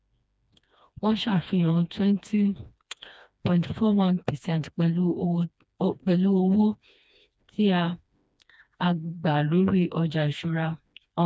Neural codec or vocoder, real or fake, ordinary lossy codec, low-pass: codec, 16 kHz, 2 kbps, FreqCodec, smaller model; fake; none; none